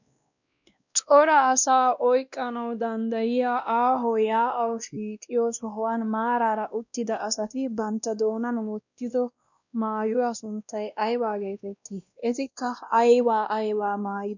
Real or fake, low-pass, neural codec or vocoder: fake; 7.2 kHz; codec, 16 kHz, 1 kbps, X-Codec, WavLM features, trained on Multilingual LibriSpeech